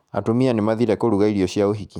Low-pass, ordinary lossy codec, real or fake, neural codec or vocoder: 19.8 kHz; none; fake; autoencoder, 48 kHz, 128 numbers a frame, DAC-VAE, trained on Japanese speech